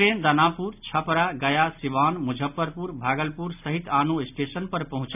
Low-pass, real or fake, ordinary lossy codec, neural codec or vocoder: 3.6 kHz; real; none; none